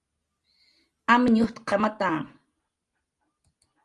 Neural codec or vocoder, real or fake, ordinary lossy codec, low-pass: none; real; Opus, 32 kbps; 10.8 kHz